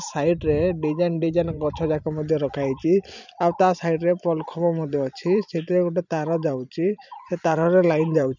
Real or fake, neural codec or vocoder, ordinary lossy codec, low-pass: fake; vocoder, 44.1 kHz, 128 mel bands every 512 samples, BigVGAN v2; none; 7.2 kHz